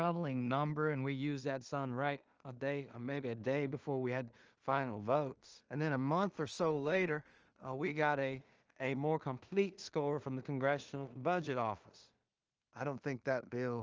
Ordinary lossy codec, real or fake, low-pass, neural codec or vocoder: Opus, 24 kbps; fake; 7.2 kHz; codec, 16 kHz in and 24 kHz out, 0.4 kbps, LongCat-Audio-Codec, two codebook decoder